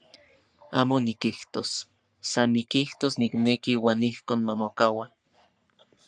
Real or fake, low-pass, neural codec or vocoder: fake; 9.9 kHz; codec, 44.1 kHz, 3.4 kbps, Pupu-Codec